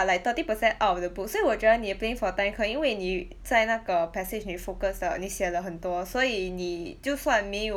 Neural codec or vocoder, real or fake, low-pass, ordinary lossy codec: none; real; 19.8 kHz; none